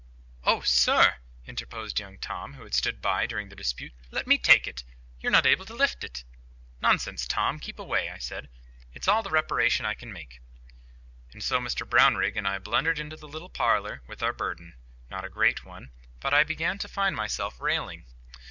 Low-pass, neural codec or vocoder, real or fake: 7.2 kHz; none; real